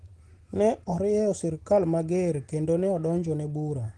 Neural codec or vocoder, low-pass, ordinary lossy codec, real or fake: none; 10.8 kHz; Opus, 16 kbps; real